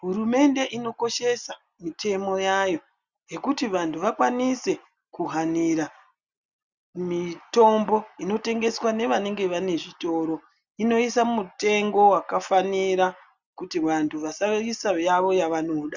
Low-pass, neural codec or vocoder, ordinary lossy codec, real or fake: 7.2 kHz; none; Opus, 64 kbps; real